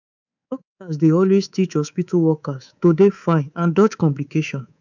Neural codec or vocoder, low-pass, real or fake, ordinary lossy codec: codec, 24 kHz, 3.1 kbps, DualCodec; 7.2 kHz; fake; none